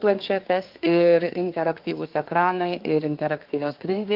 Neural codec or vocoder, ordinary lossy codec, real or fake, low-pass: codec, 24 kHz, 1 kbps, SNAC; Opus, 24 kbps; fake; 5.4 kHz